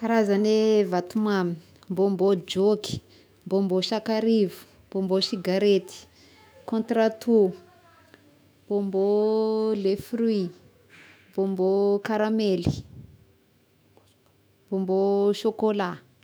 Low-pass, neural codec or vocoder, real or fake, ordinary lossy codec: none; autoencoder, 48 kHz, 128 numbers a frame, DAC-VAE, trained on Japanese speech; fake; none